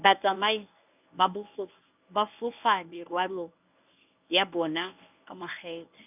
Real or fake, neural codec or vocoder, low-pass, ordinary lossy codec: fake; codec, 24 kHz, 0.9 kbps, WavTokenizer, medium speech release version 1; 3.6 kHz; none